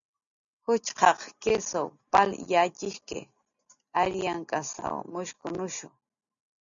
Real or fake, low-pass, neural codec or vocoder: real; 7.2 kHz; none